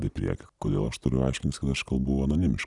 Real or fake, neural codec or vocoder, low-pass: real; none; 10.8 kHz